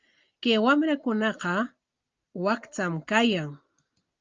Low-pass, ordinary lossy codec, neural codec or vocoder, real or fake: 7.2 kHz; Opus, 24 kbps; none; real